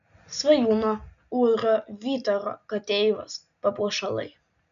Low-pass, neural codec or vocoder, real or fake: 7.2 kHz; none; real